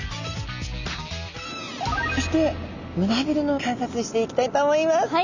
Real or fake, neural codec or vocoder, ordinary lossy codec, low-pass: real; none; none; 7.2 kHz